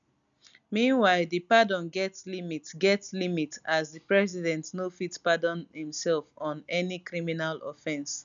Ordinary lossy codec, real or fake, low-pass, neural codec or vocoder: none; real; 7.2 kHz; none